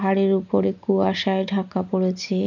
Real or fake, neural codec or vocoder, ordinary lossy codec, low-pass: real; none; none; 7.2 kHz